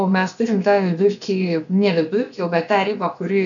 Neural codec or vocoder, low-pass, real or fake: codec, 16 kHz, about 1 kbps, DyCAST, with the encoder's durations; 7.2 kHz; fake